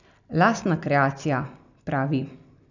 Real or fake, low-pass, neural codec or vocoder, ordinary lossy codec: real; 7.2 kHz; none; none